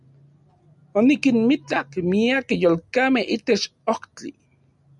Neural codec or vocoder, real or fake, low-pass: none; real; 10.8 kHz